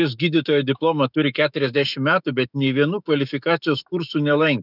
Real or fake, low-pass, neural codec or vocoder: fake; 5.4 kHz; autoencoder, 48 kHz, 128 numbers a frame, DAC-VAE, trained on Japanese speech